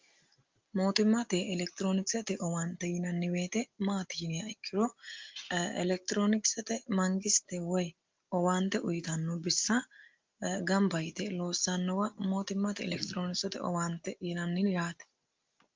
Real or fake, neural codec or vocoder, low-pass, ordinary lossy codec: real; none; 7.2 kHz; Opus, 24 kbps